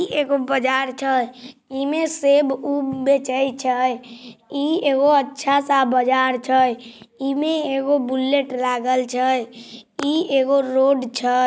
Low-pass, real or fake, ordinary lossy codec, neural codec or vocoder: none; real; none; none